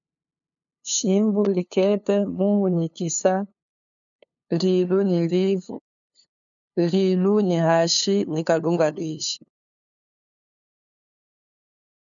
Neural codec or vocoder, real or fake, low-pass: codec, 16 kHz, 2 kbps, FunCodec, trained on LibriTTS, 25 frames a second; fake; 7.2 kHz